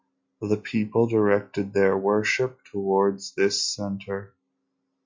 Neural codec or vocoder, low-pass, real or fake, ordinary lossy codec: none; 7.2 kHz; real; MP3, 48 kbps